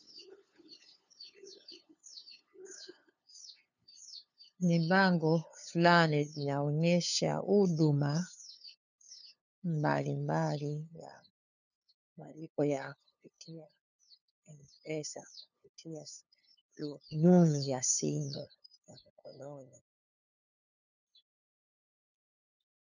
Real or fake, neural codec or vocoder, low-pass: fake; codec, 16 kHz, 2 kbps, FunCodec, trained on LibriTTS, 25 frames a second; 7.2 kHz